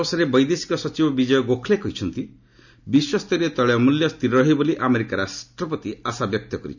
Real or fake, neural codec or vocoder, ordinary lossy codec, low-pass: real; none; none; 7.2 kHz